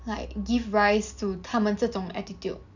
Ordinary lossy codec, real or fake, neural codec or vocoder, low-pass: none; real; none; 7.2 kHz